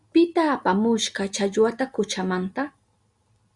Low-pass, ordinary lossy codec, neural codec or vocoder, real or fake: 10.8 kHz; Opus, 64 kbps; none; real